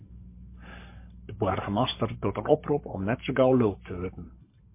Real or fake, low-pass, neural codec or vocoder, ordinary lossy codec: fake; 3.6 kHz; codec, 44.1 kHz, 7.8 kbps, Pupu-Codec; MP3, 24 kbps